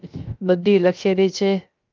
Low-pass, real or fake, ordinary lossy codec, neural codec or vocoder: 7.2 kHz; fake; Opus, 24 kbps; codec, 16 kHz, 0.3 kbps, FocalCodec